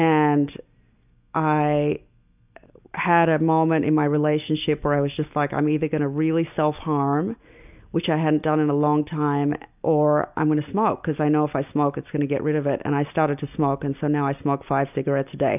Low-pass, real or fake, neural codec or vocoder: 3.6 kHz; real; none